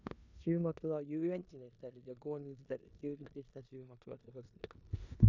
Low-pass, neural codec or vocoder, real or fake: 7.2 kHz; codec, 16 kHz in and 24 kHz out, 0.9 kbps, LongCat-Audio-Codec, four codebook decoder; fake